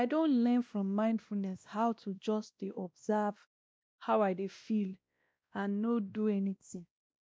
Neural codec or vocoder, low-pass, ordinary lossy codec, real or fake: codec, 16 kHz, 1 kbps, X-Codec, WavLM features, trained on Multilingual LibriSpeech; none; none; fake